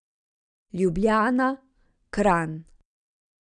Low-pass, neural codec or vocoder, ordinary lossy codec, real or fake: 9.9 kHz; none; none; real